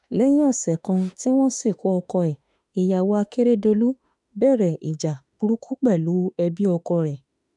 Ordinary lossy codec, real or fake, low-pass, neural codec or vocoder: none; fake; 10.8 kHz; autoencoder, 48 kHz, 32 numbers a frame, DAC-VAE, trained on Japanese speech